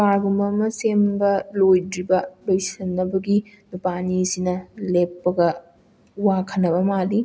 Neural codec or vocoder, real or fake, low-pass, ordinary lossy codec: none; real; none; none